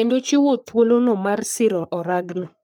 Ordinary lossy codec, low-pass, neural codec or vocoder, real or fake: none; none; codec, 44.1 kHz, 3.4 kbps, Pupu-Codec; fake